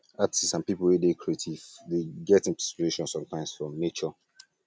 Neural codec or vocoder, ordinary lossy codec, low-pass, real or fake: none; none; none; real